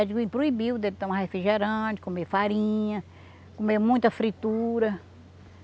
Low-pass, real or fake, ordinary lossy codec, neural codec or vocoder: none; real; none; none